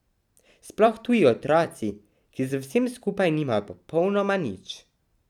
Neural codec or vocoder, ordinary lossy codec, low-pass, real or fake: vocoder, 44.1 kHz, 128 mel bands every 256 samples, BigVGAN v2; none; 19.8 kHz; fake